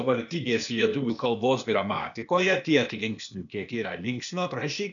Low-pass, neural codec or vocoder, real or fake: 7.2 kHz; codec, 16 kHz, 0.8 kbps, ZipCodec; fake